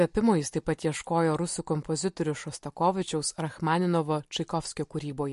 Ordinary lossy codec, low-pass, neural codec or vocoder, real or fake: MP3, 48 kbps; 14.4 kHz; none; real